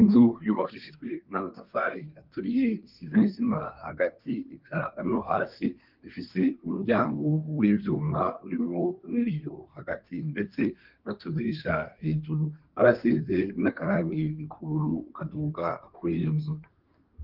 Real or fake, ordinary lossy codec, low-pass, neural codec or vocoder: fake; Opus, 32 kbps; 5.4 kHz; codec, 24 kHz, 1 kbps, SNAC